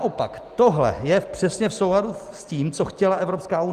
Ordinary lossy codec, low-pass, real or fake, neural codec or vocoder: Opus, 24 kbps; 14.4 kHz; real; none